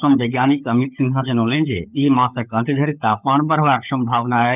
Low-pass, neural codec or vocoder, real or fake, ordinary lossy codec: 3.6 kHz; codec, 16 kHz, 16 kbps, FunCodec, trained on LibriTTS, 50 frames a second; fake; none